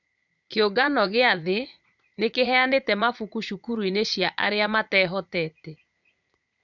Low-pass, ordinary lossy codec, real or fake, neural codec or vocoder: 7.2 kHz; none; fake; vocoder, 44.1 kHz, 80 mel bands, Vocos